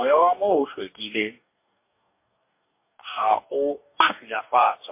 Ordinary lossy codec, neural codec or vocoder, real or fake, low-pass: MP3, 24 kbps; codec, 44.1 kHz, 2.6 kbps, DAC; fake; 3.6 kHz